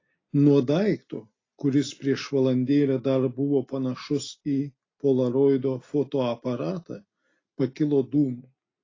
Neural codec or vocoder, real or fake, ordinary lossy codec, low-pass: none; real; AAC, 32 kbps; 7.2 kHz